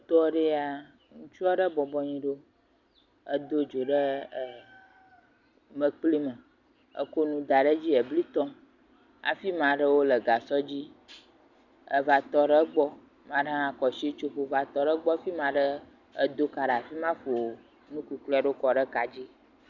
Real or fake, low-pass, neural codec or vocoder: real; 7.2 kHz; none